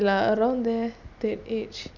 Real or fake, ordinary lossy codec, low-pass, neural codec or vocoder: real; none; 7.2 kHz; none